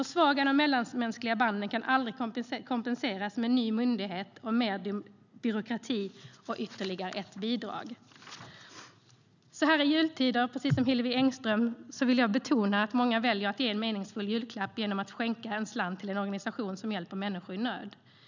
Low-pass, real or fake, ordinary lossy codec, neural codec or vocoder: 7.2 kHz; real; none; none